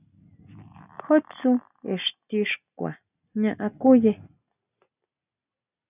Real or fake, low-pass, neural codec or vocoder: real; 3.6 kHz; none